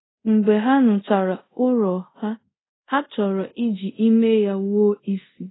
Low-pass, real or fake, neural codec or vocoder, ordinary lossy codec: 7.2 kHz; fake; codec, 24 kHz, 0.5 kbps, DualCodec; AAC, 16 kbps